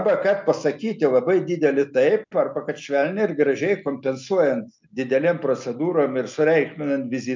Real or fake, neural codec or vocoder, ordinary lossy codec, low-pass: real; none; MP3, 64 kbps; 7.2 kHz